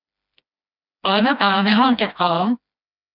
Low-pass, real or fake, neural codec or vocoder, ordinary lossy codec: 5.4 kHz; fake; codec, 16 kHz, 1 kbps, FreqCodec, smaller model; none